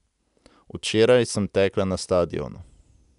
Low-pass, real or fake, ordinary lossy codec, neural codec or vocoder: 10.8 kHz; real; none; none